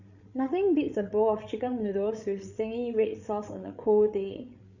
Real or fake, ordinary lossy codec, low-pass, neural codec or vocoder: fake; none; 7.2 kHz; codec, 16 kHz, 8 kbps, FreqCodec, larger model